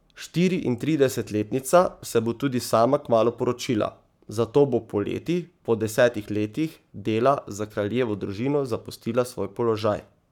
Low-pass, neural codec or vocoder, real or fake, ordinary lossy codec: 19.8 kHz; codec, 44.1 kHz, 7.8 kbps, Pupu-Codec; fake; none